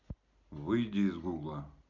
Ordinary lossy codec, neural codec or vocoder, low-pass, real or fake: AAC, 48 kbps; none; 7.2 kHz; real